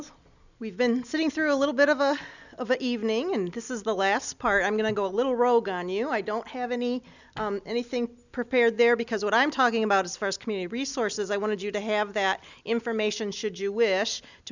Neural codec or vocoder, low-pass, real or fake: none; 7.2 kHz; real